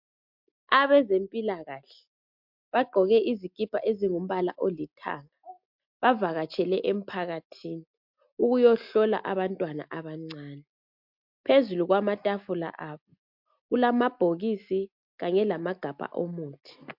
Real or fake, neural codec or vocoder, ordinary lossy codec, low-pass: real; none; MP3, 48 kbps; 5.4 kHz